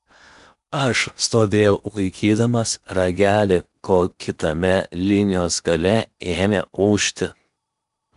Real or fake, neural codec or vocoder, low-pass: fake; codec, 16 kHz in and 24 kHz out, 0.8 kbps, FocalCodec, streaming, 65536 codes; 10.8 kHz